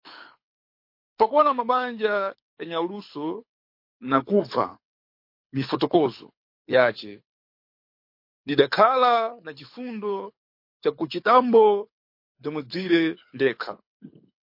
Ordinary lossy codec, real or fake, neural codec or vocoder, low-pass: MP3, 32 kbps; fake; codec, 24 kHz, 6 kbps, HILCodec; 5.4 kHz